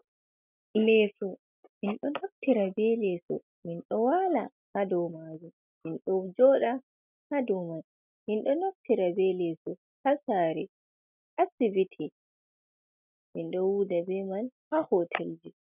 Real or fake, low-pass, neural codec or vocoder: real; 3.6 kHz; none